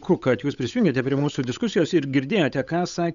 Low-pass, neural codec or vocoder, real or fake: 7.2 kHz; codec, 16 kHz, 8 kbps, FunCodec, trained on Chinese and English, 25 frames a second; fake